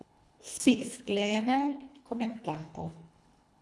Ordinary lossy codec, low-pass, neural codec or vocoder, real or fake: none; none; codec, 24 kHz, 1.5 kbps, HILCodec; fake